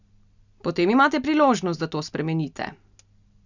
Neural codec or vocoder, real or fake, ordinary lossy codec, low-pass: none; real; none; 7.2 kHz